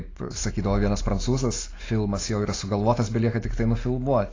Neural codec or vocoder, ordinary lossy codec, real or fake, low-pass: none; AAC, 32 kbps; real; 7.2 kHz